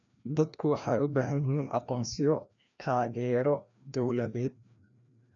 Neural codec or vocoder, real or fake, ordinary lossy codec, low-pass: codec, 16 kHz, 1 kbps, FreqCodec, larger model; fake; none; 7.2 kHz